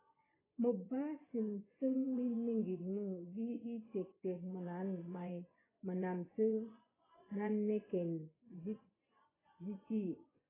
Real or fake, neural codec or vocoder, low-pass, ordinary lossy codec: fake; vocoder, 24 kHz, 100 mel bands, Vocos; 3.6 kHz; AAC, 16 kbps